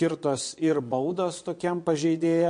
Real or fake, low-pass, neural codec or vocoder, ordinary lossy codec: fake; 9.9 kHz; vocoder, 22.05 kHz, 80 mel bands, Vocos; MP3, 48 kbps